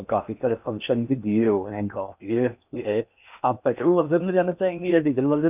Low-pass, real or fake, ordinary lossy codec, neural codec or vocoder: 3.6 kHz; fake; none; codec, 16 kHz in and 24 kHz out, 0.8 kbps, FocalCodec, streaming, 65536 codes